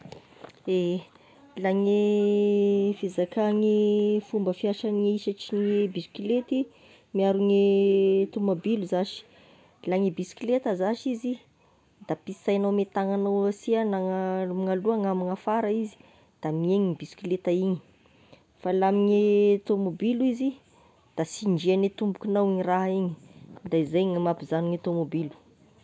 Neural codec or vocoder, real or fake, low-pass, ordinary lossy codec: none; real; none; none